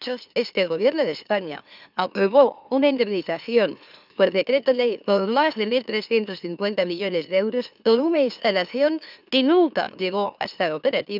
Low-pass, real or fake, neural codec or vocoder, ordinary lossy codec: 5.4 kHz; fake; autoencoder, 44.1 kHz, a latent of 192 numbers a frame, MeloTTS; none